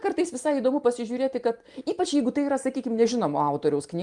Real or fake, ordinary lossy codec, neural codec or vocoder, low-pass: real; Opus, 32 kbps; none; 10.8 kHz